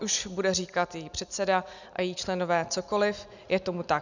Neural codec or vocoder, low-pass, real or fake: none; 7.2 kHz; real